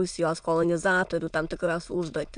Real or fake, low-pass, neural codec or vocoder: fake; 9.9 kHz; autoencoder, 22.05 kHz, a latent of 192 numbers a frame, VITS, trained on many speakers